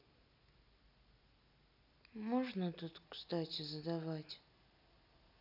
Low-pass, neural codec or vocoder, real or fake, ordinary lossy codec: 5.4 kHz; none; real; none